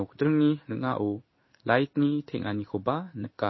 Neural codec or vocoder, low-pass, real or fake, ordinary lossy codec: codec, 16 kHz in and 24 kHz out, 1 kbps, XY-Tokenizer; 7.2 kHz; fake; MP3, 24 kbps